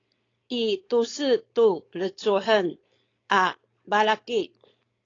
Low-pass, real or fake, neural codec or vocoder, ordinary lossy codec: 7.2 kHz; fake; codec, 16 kHz, 4.8 kbps, FACodec; AAC, 32 kbps